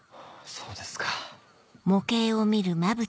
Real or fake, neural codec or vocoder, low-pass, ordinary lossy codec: real; none; none; none